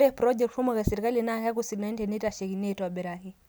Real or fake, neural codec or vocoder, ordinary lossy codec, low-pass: real; none; none; none